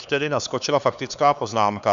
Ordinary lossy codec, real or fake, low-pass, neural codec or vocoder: Opus, 64 kbps; fake; 7.2 kHz; codec, 16 kHz, 4 kbps, X-Codec, HuBERT features, trained on LibriSpeech